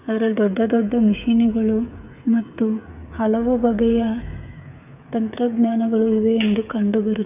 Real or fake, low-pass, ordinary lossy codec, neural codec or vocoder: fake; 3.6 kHz; none; codec, 16 kHz, 8 kbps, FreqCodec, smaller model